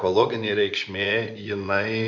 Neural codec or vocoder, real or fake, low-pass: vocoder, 24 kHz, 100 mel bands, Vocos; fake; 7.2 kHz